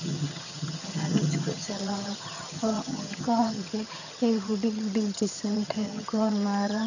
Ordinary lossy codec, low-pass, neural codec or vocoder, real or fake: none; 7.2 kHz; vocoder, 22.05 kHz, 80 mel bands, HiFi-GAN; fake